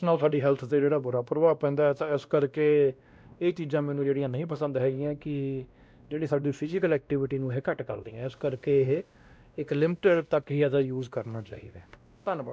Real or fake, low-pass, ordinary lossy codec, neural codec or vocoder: fake; none; none; codec, 16 kHz, 1 kbps, X-Codec, WavLM features, trained on Multilingual LibriSpeech